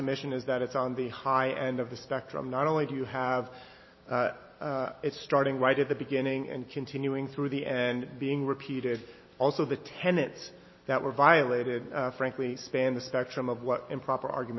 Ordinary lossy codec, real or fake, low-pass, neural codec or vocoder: MP3, 24 kbps; real; 7.2 kHz; none